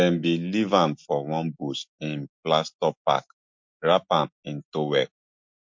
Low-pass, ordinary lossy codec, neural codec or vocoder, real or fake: 7.2 kHz; MP3, 48 kbps; none; real